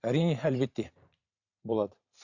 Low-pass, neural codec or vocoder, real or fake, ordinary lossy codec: 7.2 kHz; vocoder, 44.1 kHz, 128 mel bands every 512 samples, BigVGAN v2; fake; none